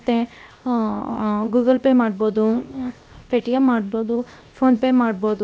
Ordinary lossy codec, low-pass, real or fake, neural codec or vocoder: none; none; fake; codec, 16 kHz, 0.7 kbps, FocalCodec